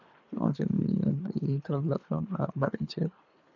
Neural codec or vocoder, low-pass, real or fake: codec, 24 kHz, 3 kbps, HILCodec; 7.2 kHz; fake